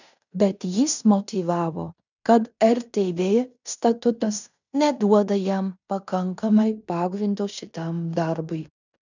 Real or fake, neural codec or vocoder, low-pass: fake; codec, 16 kHz in and 24 kHz out, 0.9 kbps, LongCat-Audio-Codec, fine tuned four codebook decoder; 7.2 kHz